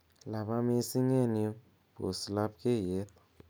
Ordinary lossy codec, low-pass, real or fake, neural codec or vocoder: none; none; real; none